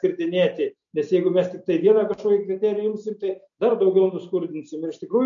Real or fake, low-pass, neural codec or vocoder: real; 7.2 kHz; none